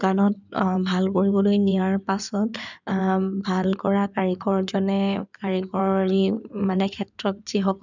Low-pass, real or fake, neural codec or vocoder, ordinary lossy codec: 7.2 kHz; fake; codec, 16 kHz in and 24 kHz out, 2.2 kbps, FireRedTTS-2 codec; none